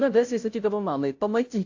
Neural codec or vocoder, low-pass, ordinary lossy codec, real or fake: codec, 16 kHz, 0.5 kbps, FunCodec, trained on Chinese and English, 25 frames a second; 7.2 kHz; AAC, 48 kbps; fake